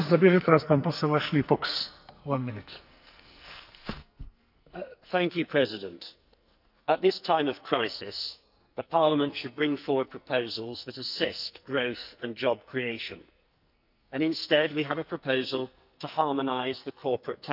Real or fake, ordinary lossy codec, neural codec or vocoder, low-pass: fake; none; codec, 44.1 kHz, 2.6 kbps, SNAC; 5.4 kHz